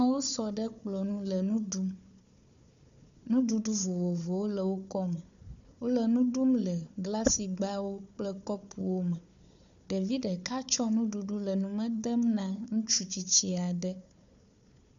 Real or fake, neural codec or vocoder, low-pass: fake; codec, 16 kHz, 4 kbps, FunCodec, trained on Chinese and English, 50 frames a second; 7.2 kHz